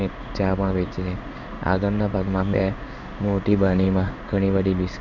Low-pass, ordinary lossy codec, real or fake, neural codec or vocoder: 7.2 kHz; none; fake; codec, 16 kHz in and 24 kHz out, 1 kbps, XY-Tokenizer